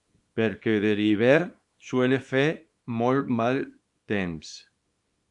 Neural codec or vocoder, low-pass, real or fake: codec, 24 kHz, 0.9 kbps, WavTokenizer, small release; 10.8 kHz; fake